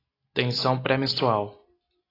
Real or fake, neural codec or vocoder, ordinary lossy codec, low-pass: real; none; AAC, 24 kbps; 5.4 kHz